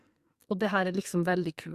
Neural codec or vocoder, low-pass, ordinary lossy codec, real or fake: codec, 44.1 kHz, 2.6 kbps, SNAC; 14.4 kHz; Opus, 64 kbps; fake